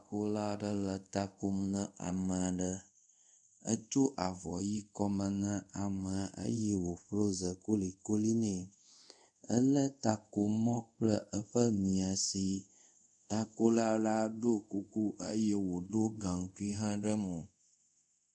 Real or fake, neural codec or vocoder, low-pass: fake; codec, 24 kHz, 0.5 kbps, DualCodec; 10.8 kHz